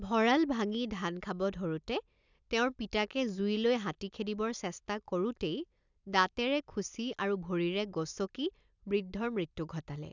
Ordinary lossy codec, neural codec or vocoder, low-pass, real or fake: none; none; 7.2 kHz; real